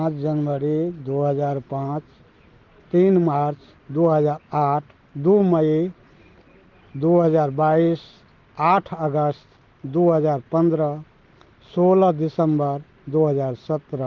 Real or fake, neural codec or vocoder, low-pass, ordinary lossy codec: real; none; 7.2 kHz; Opus, 16 kbps